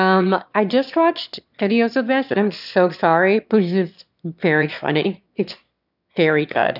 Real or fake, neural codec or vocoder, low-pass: fake; autoencoder, 22.05 kHz, a latent of 192 numbers a frame, VITS, trained on one speaker; 5.4 kHz